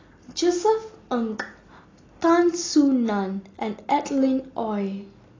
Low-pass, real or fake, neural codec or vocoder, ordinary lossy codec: 7.2 kHz; real; none; AAC, 32 kbps